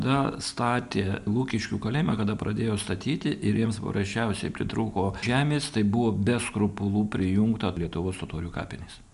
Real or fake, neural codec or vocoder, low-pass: real; none; 10.8 kHz